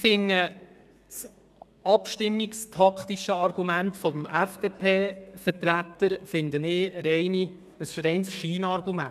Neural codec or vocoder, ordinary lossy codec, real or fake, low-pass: codec, 32 kHz, 1.9 kbps, SNAC; none; fake; 14.4 kHz